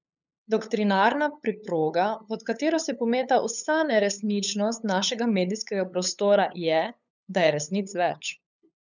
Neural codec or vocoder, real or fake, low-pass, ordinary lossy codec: codec, 16 kHz, 8 kbps, FunCodec, trained on LibriTTS, 25 frames a second; fake; 7.2 kHz; none